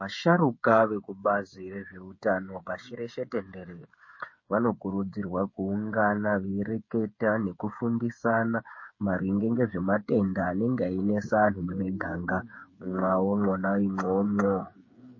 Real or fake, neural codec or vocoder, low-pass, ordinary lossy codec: fake; codec, 16 kHz, 8 kbps, FreqCodec, smaller model; 7.2 kHz; MP3, 32 kbps